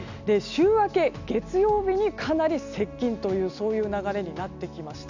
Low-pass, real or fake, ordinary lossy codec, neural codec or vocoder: 7.2 kHz; real; none; none